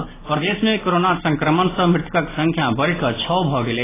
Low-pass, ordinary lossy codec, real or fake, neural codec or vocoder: 3.6 kHz; AAC, 16 kbps; real; none